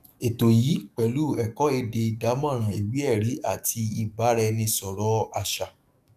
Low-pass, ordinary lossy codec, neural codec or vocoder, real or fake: 14.4 kHz; none; codec, 44.1 kHz, 7.8 kbps, DAC; fake